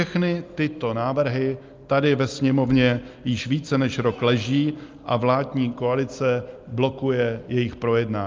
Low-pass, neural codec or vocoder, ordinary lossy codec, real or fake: 7.2 kHz; none; Opus, 32 kbps; real